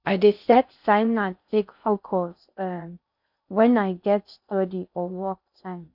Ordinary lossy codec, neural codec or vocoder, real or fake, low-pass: none; codec, 16 kHz in and 24 kHz out, 0.6 kbps, FocalCodec, streaming, 4096 codes; fake; 5.4 kHz